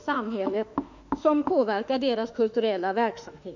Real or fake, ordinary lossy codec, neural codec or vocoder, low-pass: fake; none; autoencoder, 48 kHz, 32 numbers a frame, DAC-VAE, trained on Japanese speech; 7.2 kHz